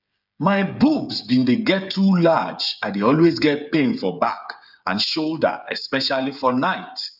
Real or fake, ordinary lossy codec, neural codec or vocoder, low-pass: fake; none; codec, 16 kHz, 8 kbps, FreqCodec, smaller model; 5.4 kHz